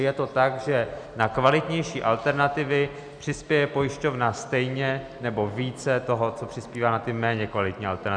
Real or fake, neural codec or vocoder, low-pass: real; none; 9.9 kHz